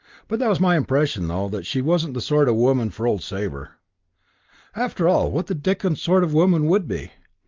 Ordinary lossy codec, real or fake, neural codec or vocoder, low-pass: Opus, 24 kbps; real; none; 7.2 kHz